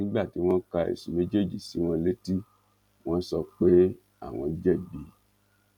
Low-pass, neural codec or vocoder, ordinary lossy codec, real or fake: 19.8 kHz; none; none; real